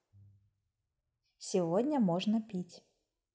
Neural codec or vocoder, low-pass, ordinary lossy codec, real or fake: none; none; none; real